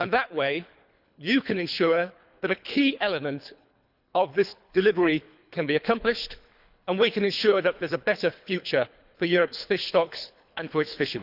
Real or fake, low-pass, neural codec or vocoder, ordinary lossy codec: fake; 5.4 kHz; codec, 24 kHz, 3 kbps, HILCodec; none